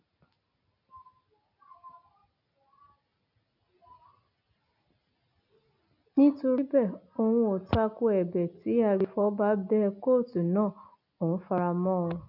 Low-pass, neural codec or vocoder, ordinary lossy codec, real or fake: 5.4 kHz; none; none; real